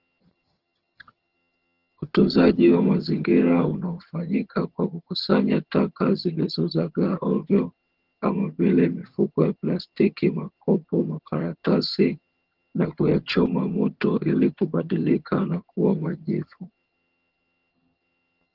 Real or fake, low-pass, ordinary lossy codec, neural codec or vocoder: fake; 5.4 kHz; Opus, 16 kbps; vocoder, 22.05 kHz, 80 mel bands, HiFi-GAN